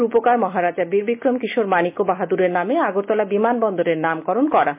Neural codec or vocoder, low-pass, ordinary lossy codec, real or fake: none; 3.6 kHz; MP3, 32 kbps; real